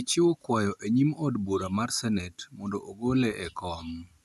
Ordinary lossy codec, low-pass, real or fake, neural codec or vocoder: none; 14.4 kHz; real; none